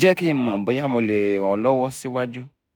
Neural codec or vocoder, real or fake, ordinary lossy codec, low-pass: autoencoder, 48 kHz, 32 numbers a frame, DAC-VAE, trained on Japanese speech; fake; none; none